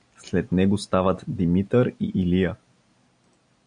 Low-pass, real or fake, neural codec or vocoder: 9.9 kHz; real; none